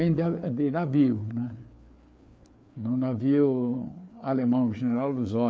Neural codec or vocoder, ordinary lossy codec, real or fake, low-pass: codec, 16 kHz, 4 kbps, FreqCodec, larger model; none; fake; none